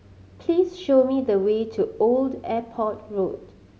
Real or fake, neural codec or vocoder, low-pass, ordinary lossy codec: real; none; none; none